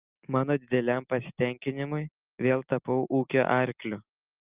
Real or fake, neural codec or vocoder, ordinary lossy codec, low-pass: real; none; Opus, 32 kbps; 3.6 kHz